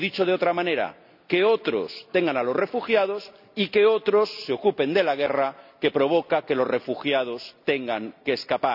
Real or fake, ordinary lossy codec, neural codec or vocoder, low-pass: real; none; none; 5.4 kHz